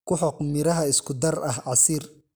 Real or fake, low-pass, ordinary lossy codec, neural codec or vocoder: real; none; none; none